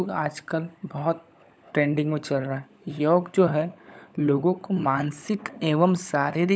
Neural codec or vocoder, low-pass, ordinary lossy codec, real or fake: codec, 16 kHz, 16 kbps, FunCodec, trained on LibriTTS, 50 frames a second; none; none; fake